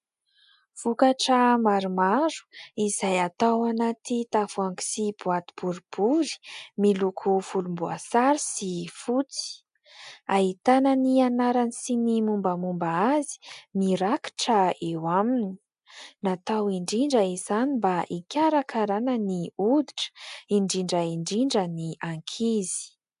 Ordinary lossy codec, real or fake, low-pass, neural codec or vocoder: AAC, 96 kbps; real; 10.8 kHz; none